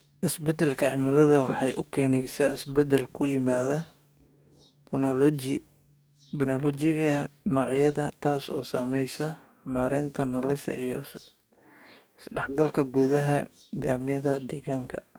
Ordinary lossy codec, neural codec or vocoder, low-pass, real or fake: none; codec, 44.1 kHz, 2.6 kbps, DAC; none; fake